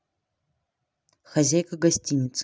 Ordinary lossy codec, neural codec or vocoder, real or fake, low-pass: none; none; real; none